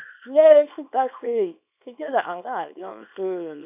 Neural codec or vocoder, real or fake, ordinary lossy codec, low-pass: codec, 24 kHz, 0.9 kbps, WavTokenizer, small release; fake; none; 3.6 kHz